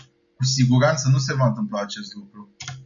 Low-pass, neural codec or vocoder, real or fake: 7.2 kHz; none; real